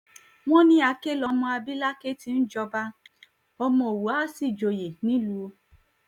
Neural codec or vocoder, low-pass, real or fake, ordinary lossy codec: none; 19.8 kHz; real; none